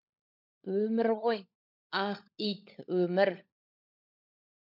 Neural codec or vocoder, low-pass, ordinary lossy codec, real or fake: codec, 16 kHz, 16 kbps, FunCodec, trained on LibriTTS, 50 frames a second; 5.4 kHz; MP3, 48 kbps; fake